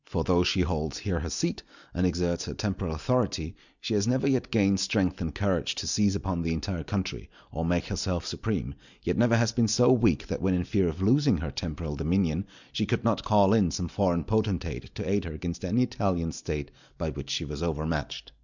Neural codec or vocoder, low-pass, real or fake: none; 7.2 kHz; real